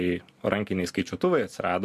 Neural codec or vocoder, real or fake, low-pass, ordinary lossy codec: none; real; 14.4 kHz; MP3, 96 kbps